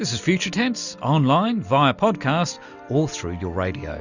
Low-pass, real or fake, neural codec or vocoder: 7.2 kHz; real; none